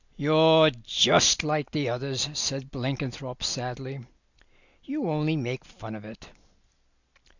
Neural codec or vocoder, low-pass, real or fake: none; 7.2 kHz; real